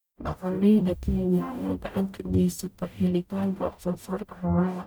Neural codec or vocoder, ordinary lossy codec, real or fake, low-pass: codec, 44.1 kHz, 0.9 kbps, DAC; none; fake; none